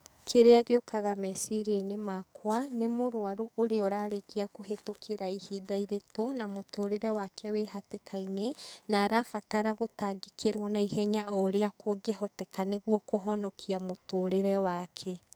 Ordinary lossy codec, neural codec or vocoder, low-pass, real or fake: none; codec, 44.1 kHz, 2.6 kbps, SNAC; none; fake